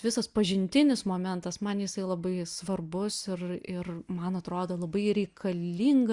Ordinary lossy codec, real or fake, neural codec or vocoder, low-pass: Opus, 64 kbps; real; none; 10.8 kHz